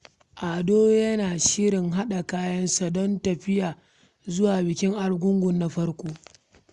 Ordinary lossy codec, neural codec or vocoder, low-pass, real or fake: Opus, 64 kbps; none; 10.8 kHz; real